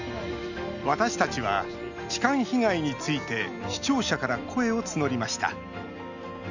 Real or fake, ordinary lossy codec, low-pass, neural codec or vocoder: real; none; 7.2 kHz; none